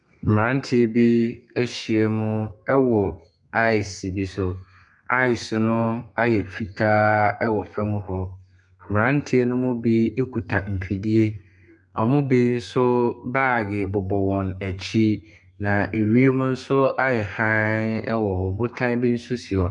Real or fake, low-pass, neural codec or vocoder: fake; 10.8 kHz; codec, 32 kHz, 1.9 kbps, SNAC